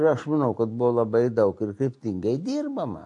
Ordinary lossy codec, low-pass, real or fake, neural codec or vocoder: MP3, 48 kbps; 10.8 kHz; real; none